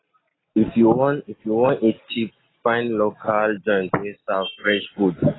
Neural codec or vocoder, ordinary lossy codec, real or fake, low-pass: none; AAC, 16 kbps; real; 7.2 kHz